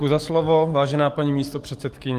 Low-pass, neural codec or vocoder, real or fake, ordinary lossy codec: 14.4 kHz; codec, 44.1 kHz, 7.8 kbps, DAC; fake; Opus, 24 kbps